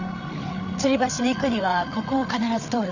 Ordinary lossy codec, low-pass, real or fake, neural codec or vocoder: none; 7.2 kHz; fake; codec, 16 kHz, 8 kbps, FreqCodec, larger model